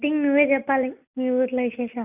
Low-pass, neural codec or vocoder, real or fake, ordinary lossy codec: 3.6 kHz; none; real; none